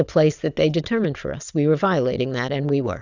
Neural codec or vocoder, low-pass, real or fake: vocoder, 22.05 kHz, 80 mel bands, Vocos; 7.2 kHz; fake